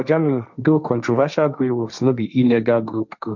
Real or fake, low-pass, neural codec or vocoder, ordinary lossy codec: fake; 7.2 kHz; codec, 16 kHz, 1.1 kbps, Voila-Tokenizer; none